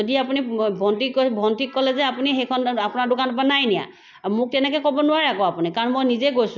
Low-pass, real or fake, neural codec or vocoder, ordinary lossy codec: 7.2 kHz; real; none; none